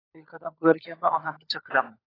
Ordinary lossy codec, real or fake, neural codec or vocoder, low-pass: AAC, 24 kbps; fake; codec, 16 kHz, 16 kbps, FunCodec, trained on LibriTTS, 50 frames a second; 5.4 kHz